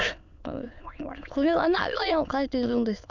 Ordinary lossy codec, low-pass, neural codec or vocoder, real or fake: none; 7.2 kHz; autoencoder, 22.05 kHz, a latent of 192 numbers a frame, VITS, trained on many speakers; fake